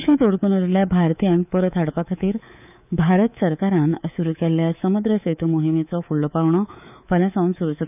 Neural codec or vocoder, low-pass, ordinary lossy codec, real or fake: codec, 24 kHz, 3.1 kbps, DualCodec; 3.6 kHz; none; fake